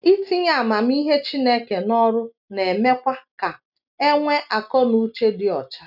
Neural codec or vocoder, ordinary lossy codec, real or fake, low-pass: none; none; real; 5.4 kHz